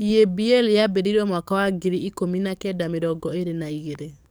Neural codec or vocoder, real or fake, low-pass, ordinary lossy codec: codec, 44.1 kHz, 7.8 kbps, DAC; fake; none; none